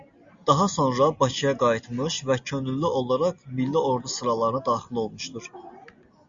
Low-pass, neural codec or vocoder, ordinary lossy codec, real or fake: 7.2 kHz; none; Opus, 64 kbps; real